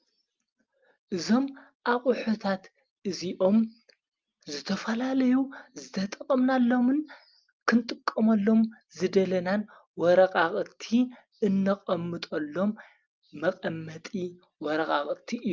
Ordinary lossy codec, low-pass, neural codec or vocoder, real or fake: Opus, 24 kbps; 7.2 kHz; none; real